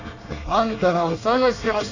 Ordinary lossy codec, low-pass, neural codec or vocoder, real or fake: none; 7.2 kHz; codec, 24 kHz, 1 kbps, SNAC; fake